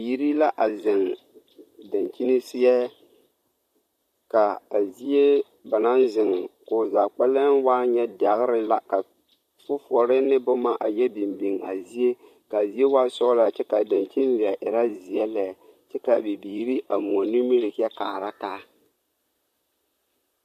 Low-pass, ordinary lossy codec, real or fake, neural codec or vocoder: 14.4 kHz; MP3, 64 kbps; fake; vocoder, 44.1 kHz, 128 mel bands, Pupu-Vocoder